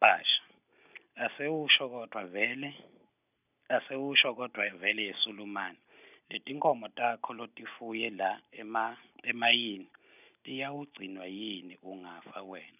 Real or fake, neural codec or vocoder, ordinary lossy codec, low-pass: real; none; none; 3.6 kHz